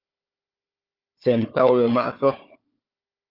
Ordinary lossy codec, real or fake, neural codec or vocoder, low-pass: Opus, 24 kbps; fake; codec, 16 kHz, 4 kbps, FunCodec, trained on Chinese and English, 50 frames a second; 5.4 kHz